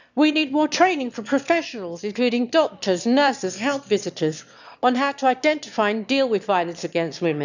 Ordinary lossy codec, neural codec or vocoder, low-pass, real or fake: none; autoencoder, 22.05 kHz, a latent of 192 numbers a frame, VITS, trained on one speaker; 7.2 kHz; fake